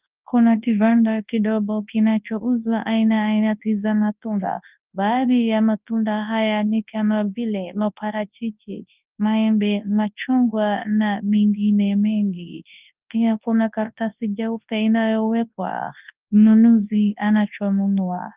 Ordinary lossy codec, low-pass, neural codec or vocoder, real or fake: Opus, 24 kbps; 3.6 kHz; codec, 24 kHz, 0.9 kbps, WavTokenizer, large speech release; fake